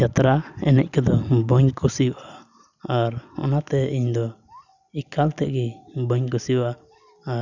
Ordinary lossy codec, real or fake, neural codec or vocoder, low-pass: none; real; none; 7.2 kHz